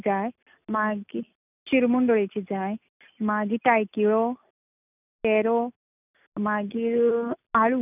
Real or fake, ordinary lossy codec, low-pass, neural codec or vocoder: real; none; 3.6 kHz; none